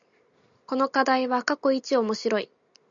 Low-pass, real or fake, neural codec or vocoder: 7.2 kHz; real; none